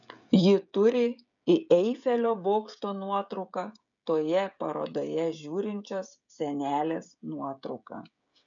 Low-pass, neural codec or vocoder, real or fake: 7.2 kHz; codec, 16 kHz, 16 kbps, FreqCodec, smaller model; fake